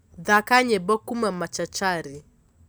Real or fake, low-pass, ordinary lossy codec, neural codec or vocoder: real; none; none; none